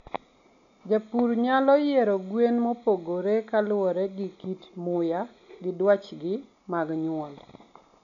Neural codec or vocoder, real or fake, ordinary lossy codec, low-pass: none; real; none; 7.2 kHz